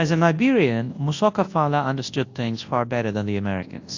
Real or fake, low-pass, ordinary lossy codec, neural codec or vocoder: fake; 7.2 kHz; AAC, 48 kbps; codec, 24 kHz, 0.9 kbps, WavTokenizer, large speech release